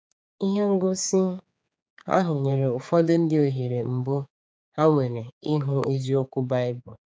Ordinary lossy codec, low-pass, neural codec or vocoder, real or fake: none; none; codec, 16 kHz, 4 kbps, X-Codec, HuBERT features, trained on general audio; fake